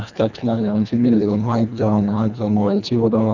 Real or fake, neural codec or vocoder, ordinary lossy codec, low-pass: fake; codec, 24 kHz, 1.5 kbps, HILCodec; none; 7.2 kHz